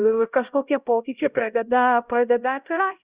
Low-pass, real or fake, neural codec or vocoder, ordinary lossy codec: 3.6 kHz; fake; codec, 16 kHz, 0.5 kbps, X-Codec, HuBERT features, trained on LibriSpeech; Opus, 64 kbps